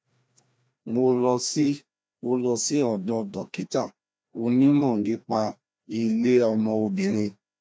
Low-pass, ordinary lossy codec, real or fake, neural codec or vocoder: none; none; fake; codec, 16 kHz, 1 kbps, FreqCodec, larger model